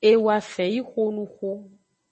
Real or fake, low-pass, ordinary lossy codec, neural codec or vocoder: real; 10.8 kHz; MP3, 32 kbps; none